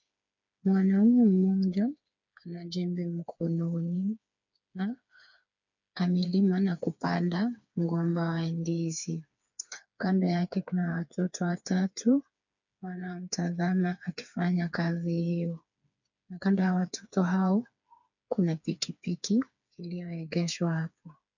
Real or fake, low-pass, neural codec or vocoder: fake; 7.2 kHz; codec, 16 kHz, 4 kbps, FreqCodec, smaller model